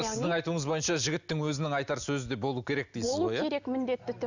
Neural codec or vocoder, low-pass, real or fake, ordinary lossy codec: none; 7.2 kHz; real; none